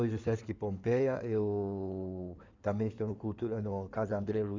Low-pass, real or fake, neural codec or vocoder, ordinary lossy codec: 7.2 kHz; fake; codec, 16 kHz in and 24 kHz out, 2.2 kbps, FireRedTTS-2 codec; MP3, 64 kbps